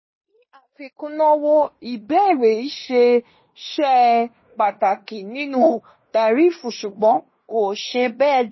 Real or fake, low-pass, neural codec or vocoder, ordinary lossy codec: fake; 7.2 kHz; codec, 16 kHz in and 24 kHz out, 0.9 kbps, LongCat-Audio-Codec, fine tuned four codebook decoder; MP3, 24 kbps